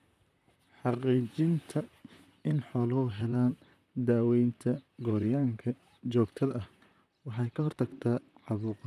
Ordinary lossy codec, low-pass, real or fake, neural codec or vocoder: none; 14.4 kHz; fake; vocoder, 44.1 kHz, 128 mel bands, Pupu-Vocoder